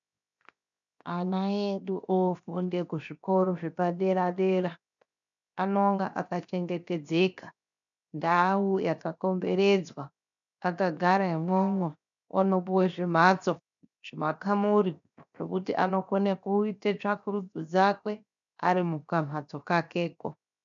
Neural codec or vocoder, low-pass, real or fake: codec, 16 kHz, 0.7 kbps, FocalCodec; 7.2 kHz; fake